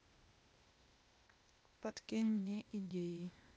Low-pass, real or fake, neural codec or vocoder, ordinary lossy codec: none; fake; codec, 16 kHz, 0.8 kbps, ZipCodec; none